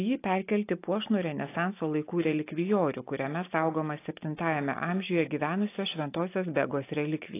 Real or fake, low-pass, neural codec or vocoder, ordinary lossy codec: real; 3.6 kHz; none; AAC, 24 kbps